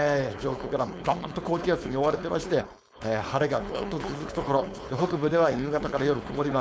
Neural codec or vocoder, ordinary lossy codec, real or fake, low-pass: codec, 16 kHz, 4.8 kbps, FACodec; none; fake; none